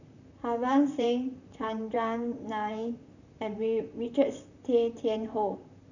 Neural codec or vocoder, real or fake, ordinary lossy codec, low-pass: vocoder, 44.1 kHz, 128 mel bands, Pupu-Vocoder; fake; none; 7.2 kHz